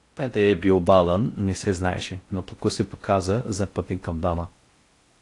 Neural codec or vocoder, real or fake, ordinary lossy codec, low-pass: codec, 16 kHz in and 24 kHz out, 0.6 kbps, FocalCodec, streaming, 4096 codes; fake; AAC, 48 kbps; 10.8 kHz